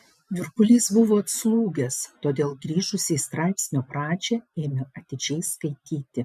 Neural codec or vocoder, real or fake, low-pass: none; real; 14.4 kHz